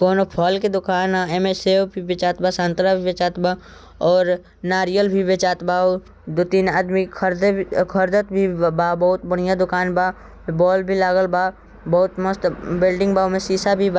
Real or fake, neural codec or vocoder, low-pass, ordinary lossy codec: real; none; none; none